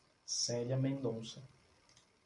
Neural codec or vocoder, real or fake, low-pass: none; real; 10.8 kHz